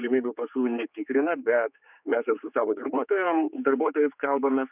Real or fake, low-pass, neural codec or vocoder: fake; 3.6 kHz; codec, 16 kHz, 4 kbps, X-Codec, HuBERT features, trained on general audio